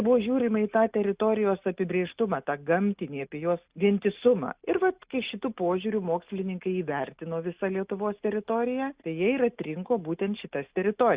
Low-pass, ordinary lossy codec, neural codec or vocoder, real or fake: 3.6 kHz; Opus, 64 kbps; none; real